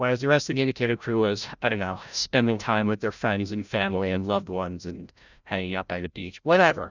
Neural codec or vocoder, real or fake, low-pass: codec, 16 kHz, 0.5 kbps, FreqCodec, larger model; fake; 7.2 kHz